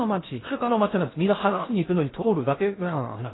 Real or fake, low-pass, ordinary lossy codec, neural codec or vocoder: fake; 7.2 kHz; AAC, 16 kbps; codec, 16 kHz in and 24 kHz out, 0.6 kbps, FocalCodec, streaming, 2048 codes